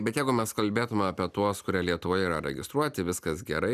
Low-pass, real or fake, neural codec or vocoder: 14.4 kHz; real; none